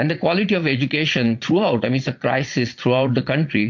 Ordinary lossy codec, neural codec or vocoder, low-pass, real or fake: MP3, 48 kbps; vocoder, 22.05 kHz, 80 mel bands, Vocos; 7.2 kHz; fake